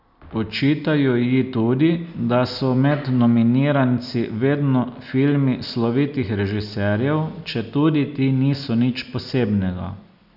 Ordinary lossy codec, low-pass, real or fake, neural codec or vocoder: none; 5.4 kHz; real; none